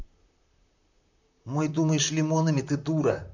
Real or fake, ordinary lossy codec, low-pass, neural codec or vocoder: fake; none; 7.2 kHz; vocoder, 44.1 kHz, 128 mel bands, Pupu-Vocoder